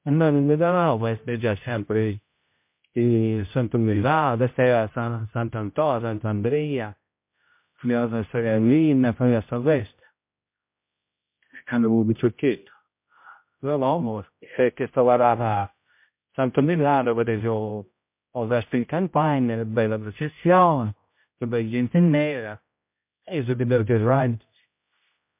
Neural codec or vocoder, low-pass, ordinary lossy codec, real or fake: codec, 16 kHz, 0.5 kbps, X-Codec, HuBERT features, trained on general audio; 3.6 kHz; MP3, 32 kbps; fake